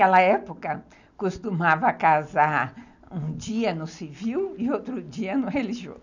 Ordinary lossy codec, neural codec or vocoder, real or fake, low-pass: none; none; real; 7.2 kHz